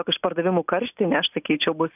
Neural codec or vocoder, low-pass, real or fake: none; 3.6 kHz; real